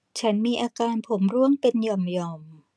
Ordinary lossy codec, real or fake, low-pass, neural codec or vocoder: none; real; none; none